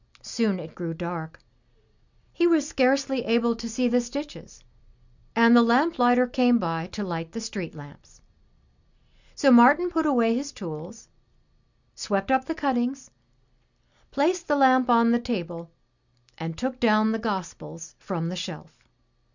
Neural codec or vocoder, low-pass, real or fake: none; 7.2 kHz; real